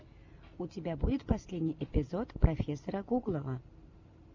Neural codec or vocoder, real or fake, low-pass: none; real; 7.2 kHz